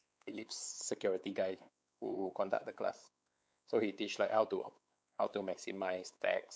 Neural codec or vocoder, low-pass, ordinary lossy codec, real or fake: codec, 16 kHz, 4 kbps, X-Codec, WavLM features, trained on Multilingual LibriSpeech; none; none; fake